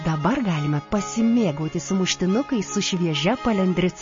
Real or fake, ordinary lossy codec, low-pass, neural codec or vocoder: real; MP3, 32 kbps; 7.2 kHz; none